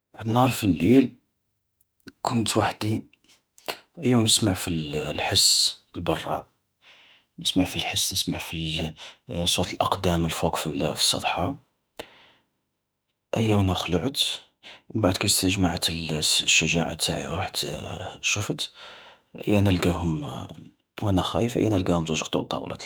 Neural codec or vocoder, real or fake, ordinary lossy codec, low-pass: autoencoder, 48 kHz, 32 numbers a frame, DAC-VAE, trained on Japanese speech; fake; none; none